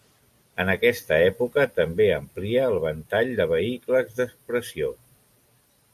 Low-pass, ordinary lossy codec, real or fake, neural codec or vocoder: 14.4 kHz; MP3, 96 kbps; real; none